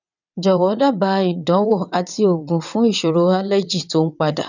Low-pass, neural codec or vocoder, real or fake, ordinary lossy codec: 7.2 kHz; vocoder, 22.05 kHz, 80 mel bands, WaveNeXt; fake; none